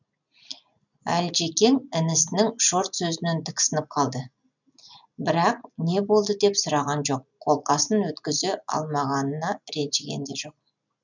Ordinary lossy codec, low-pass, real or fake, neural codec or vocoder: none; 7.2 kHz; real; none